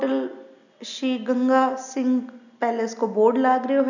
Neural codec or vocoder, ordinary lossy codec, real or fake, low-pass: none; none; real; 7.2 kHz